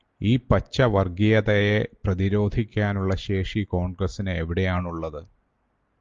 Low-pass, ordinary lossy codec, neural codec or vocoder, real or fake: 7.2 kHz; Opus, 32 kbps; none; real